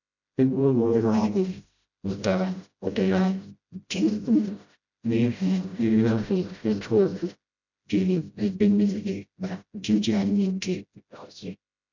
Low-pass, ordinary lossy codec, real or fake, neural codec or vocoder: 7.2 kHz; none; fake; codec, 16 kHz, 0.5 kbps, FreqCodec, smaller model